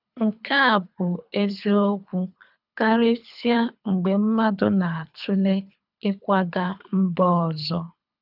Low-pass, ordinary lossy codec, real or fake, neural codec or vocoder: 5.4 kHz; none; fake; codec, 24 kHz, 3 kbps, HILCodec